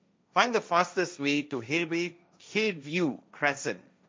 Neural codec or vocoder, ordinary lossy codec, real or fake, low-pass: codec, 16 kHz, 1.1 kbps, Voila-Tokenizer; none; fake; none